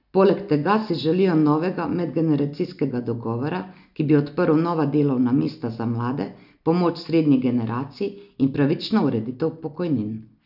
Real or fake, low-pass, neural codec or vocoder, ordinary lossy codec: real; 5.4 kHz; none; none